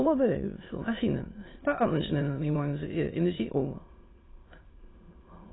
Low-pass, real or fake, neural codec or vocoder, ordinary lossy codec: 7.2 kHz; fake; autoencoder, 22.05 kHz, a latent of 192 numbers a frame, VITS, trained on many speakers; AAC, 16 kbps